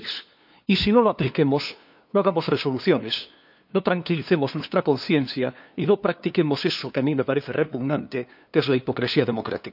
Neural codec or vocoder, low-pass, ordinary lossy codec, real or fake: codec, 16 kHz, 2 kbps, FunCodec, trained on LibriTTS, 25 frames a second; 5.4 kHz; none; fake